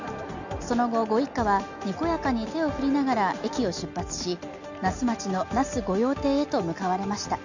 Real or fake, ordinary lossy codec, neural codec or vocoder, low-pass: real; none; none; 7.2 kHz